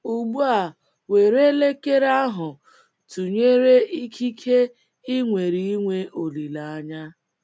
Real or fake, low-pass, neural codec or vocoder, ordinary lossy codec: real; none; none; none